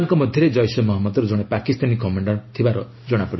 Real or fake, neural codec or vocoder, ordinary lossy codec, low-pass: real; none; MP3, 24 kbps; 7.2 kHz